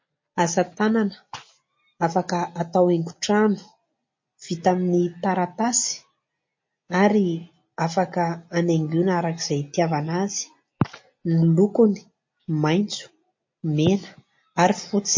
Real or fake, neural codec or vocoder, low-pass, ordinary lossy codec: real; none; 7.2 kHz; MP3, 32 kbps